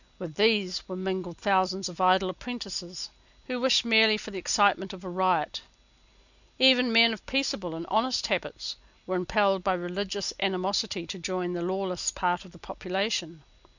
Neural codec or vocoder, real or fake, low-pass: none; real; 7.2 kHz